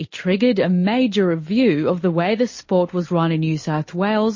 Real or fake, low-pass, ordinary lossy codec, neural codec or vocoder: real; 7.2 kHz; MP3, 32 kbps; none